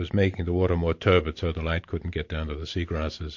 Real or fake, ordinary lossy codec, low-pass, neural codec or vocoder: fake; MP3, 48 kbps; 7.2 kHz; vocoder, 44.1 kHz, 128 mel bands every 512 samples, BigVGAN v2